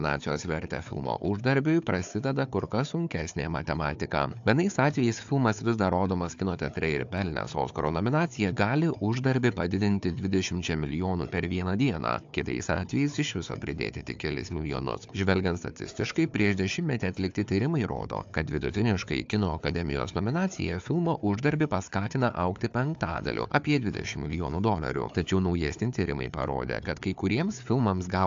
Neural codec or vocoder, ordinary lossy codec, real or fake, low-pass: codec, 16 kHz, 4 kbps, FunCodec, trained on Chinese and English, 50 frames a second; AAC, 64 kbps; fake; 7.2 kHz